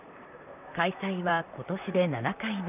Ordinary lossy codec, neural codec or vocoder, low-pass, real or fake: none; vocoder, 44.1 kHz, 128 mel bands, Pupu-Vocoder; 3.6 kHz; fake